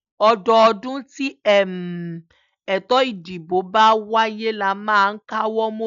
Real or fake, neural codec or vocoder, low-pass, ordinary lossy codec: real; none; 7.2 kHz; none